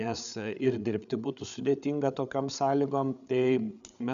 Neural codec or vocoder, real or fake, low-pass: codec, 16 kHz, 8 kbps, FreqCodec, larger model; fake; 7.2 kHz